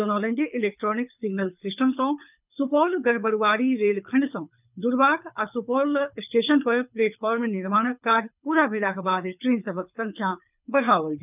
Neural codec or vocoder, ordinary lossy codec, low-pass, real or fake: codec, 24 kHz, 6 kbps, HILCodec; none; 3.6 kHz; fake